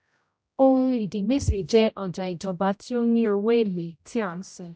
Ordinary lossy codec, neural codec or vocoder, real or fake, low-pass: none; codec, 16 kHz, 0.5 kbps, X-Codec, HuBERT features, trained on general audio; fake; none